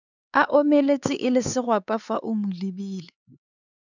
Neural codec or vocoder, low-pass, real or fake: codec, 16 kHz, 4 kbps, X-Codec, HuBERT features, trained on LibriSpeech; 7.2 kHz; fake